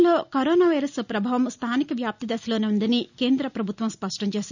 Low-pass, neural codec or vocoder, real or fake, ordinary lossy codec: 7.2 kHz; none; real; none